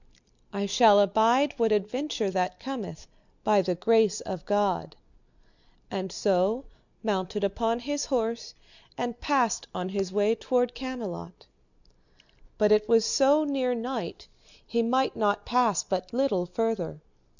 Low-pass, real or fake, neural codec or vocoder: 7.2 kHz; real; none